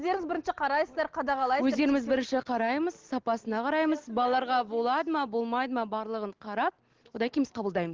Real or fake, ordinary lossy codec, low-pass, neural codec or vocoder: real; Opus, 16 kbps; 7.2 kHz; none